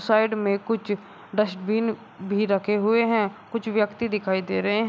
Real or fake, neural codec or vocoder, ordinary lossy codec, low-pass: real; none; none; none